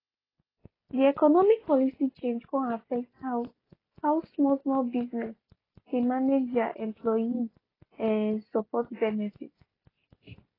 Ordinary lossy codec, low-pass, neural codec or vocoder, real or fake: AAC, 24 kbps; 5.4 kHz; none; real